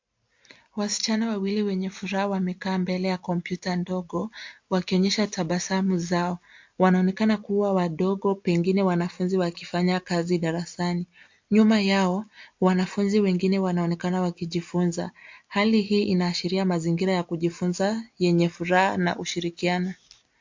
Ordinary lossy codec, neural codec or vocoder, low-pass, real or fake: MP3, 48 kbps; none; 7.2 kHz; real